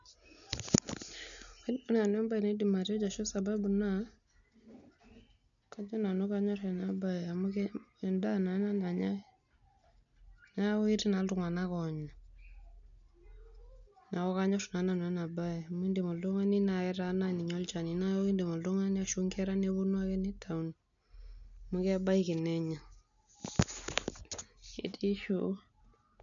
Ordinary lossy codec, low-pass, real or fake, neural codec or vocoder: none; 7.2 kHz; real; none